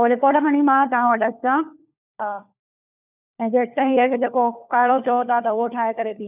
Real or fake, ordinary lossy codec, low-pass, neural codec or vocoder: fake; none; 3.6 kHz; codec, 16 kHz, 4 kbps, FunCodec, trained on LibriTTS, 50 frames a second